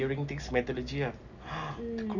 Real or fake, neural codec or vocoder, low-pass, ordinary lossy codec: real; none; 7.2 kHz; none